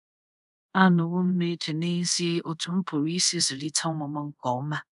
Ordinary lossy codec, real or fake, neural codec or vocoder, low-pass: none; fake; codec, 24 kHz, 0.5 kbps, DualCodec; 10.8 kHz